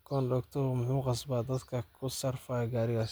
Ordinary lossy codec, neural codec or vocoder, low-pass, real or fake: none; none; none; real